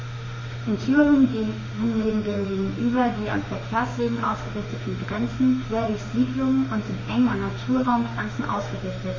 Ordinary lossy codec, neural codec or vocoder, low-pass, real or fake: MP3, 32 kbps; autoencoder, 48 kHz, 32 numbers a frame, DAC-VAE, trained on Japanese speech; 7.2 kHz; fake